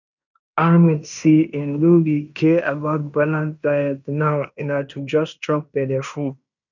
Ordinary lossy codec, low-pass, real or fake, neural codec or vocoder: none; 7.2 kHz; fake; codec, 16 kHz in and 24 kHz out, 0.9 kbps, LongCat-Audio-Codec, fine tuned four codebook decoder